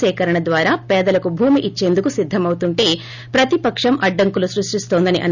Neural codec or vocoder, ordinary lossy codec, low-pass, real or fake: none; none; 7.2 kHz; real